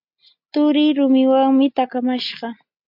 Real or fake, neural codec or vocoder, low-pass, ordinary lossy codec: real; none; 5.4 kHz; MP3, 48 kbps